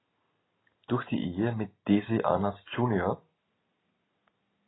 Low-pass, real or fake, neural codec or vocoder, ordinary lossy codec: 7.2 kHz; real; none; AAC, 16 kbps